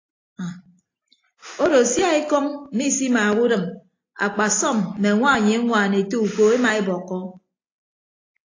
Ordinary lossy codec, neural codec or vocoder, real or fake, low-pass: AAC, 32 kbps; none; real; 7.2 kHz